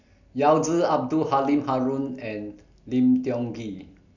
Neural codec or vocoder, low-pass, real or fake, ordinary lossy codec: none; 7.2 kHz; real; none